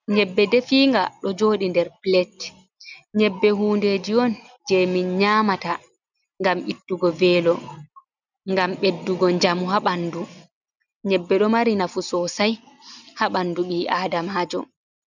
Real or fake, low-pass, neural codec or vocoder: real; 7.2 kHz; none